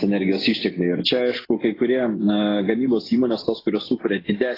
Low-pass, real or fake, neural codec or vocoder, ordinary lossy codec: 5.4 kHz; real; none; AAC, 24 kbps